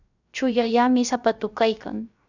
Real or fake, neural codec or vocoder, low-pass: fake; codec, 16 kHz, 0.3 kbps, FocalCodec; 7.2 kHz